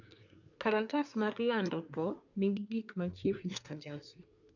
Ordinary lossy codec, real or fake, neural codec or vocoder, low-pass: none; fake; codec, 24 kHz, 1 kbps, SNAC; 7.2 kHz